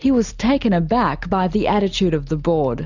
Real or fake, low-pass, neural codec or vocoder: real; 7.2 kHz; none